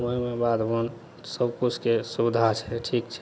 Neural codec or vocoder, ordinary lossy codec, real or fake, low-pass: none; none; real; none